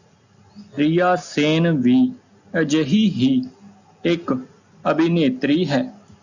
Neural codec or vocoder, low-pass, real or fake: none; 7.2 kHz; real